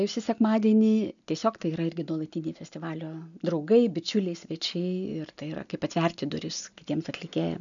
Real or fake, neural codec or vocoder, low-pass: real; none; 7.2 kHz